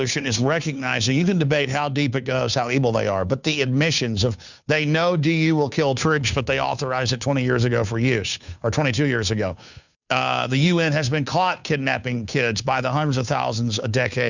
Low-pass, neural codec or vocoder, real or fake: 7.2 kHz; codec, 16 kHz, 2 kbps, FunCodec, trained on Chinese and English, 25 frames a second; fake